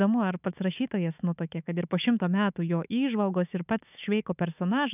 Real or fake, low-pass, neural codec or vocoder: fake; 3.6 kHz; autoencoder, 48 kHz, 128 numbers a frame, DAC-VAE, trained on Japanese speech